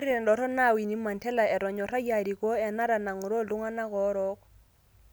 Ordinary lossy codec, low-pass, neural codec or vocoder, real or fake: none; none; none; real